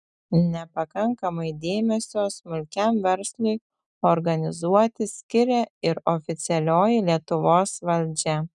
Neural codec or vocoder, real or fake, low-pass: none; real; 10.8 kHz